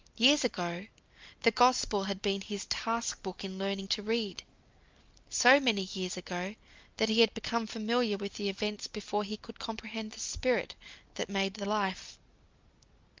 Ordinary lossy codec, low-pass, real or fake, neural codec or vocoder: Opus, 24 kbps; 7.2 kHz; real; none